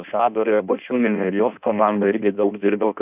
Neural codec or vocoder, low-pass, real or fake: codec, 16 kHz in and 24 kHz out, 0.6 kbps, FireRedTTS-2 codec; 3.6 kHz; fake